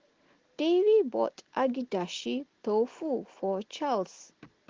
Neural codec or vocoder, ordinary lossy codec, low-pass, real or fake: none; Opus, 16 kbps; 7.2 kHz; real